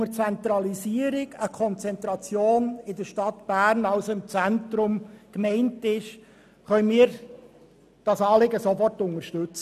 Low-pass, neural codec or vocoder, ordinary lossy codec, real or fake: 14.4 kHz; none; none; real